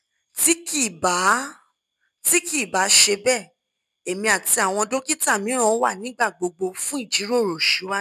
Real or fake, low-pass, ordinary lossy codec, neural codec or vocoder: real; 14.4 kHz; none; none